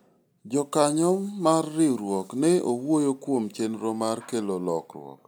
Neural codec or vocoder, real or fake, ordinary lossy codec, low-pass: none; real; none; none